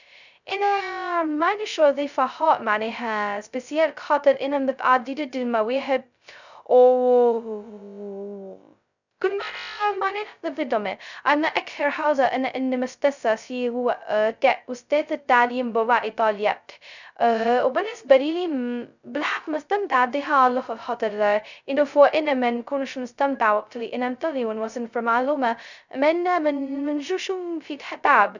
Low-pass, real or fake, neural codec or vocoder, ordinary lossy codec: 7.2 kHz; fake; codec, 16 kHz, 0.2 kbps, FocalCodec; none